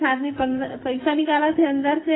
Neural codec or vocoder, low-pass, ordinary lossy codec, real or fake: codec, 44.1 kHz, 2.6 kbps, SNAC; 7.2 kHz; AAC, 16 kbps; fake